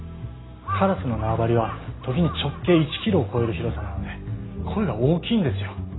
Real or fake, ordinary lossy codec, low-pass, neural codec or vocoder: real; AAC, 16 kbps; 7.2 kHz; none